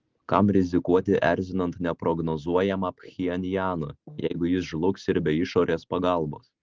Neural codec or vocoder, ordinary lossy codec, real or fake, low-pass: none; Opus, 16 kbps; real; 7.2 kHz